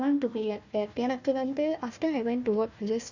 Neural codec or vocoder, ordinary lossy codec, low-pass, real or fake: codec, 16 kHz, 1 kbps, FunCodec, trained on Chinese and English, 50 frames a second; none; 7.2 kHz; fake